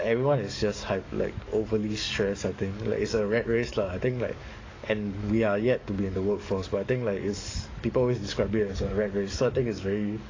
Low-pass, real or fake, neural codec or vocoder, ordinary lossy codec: 7.2 kHz; fake; codec, 44.1 kHz, 7.8 kbps, DAC; AAC, 32 kbps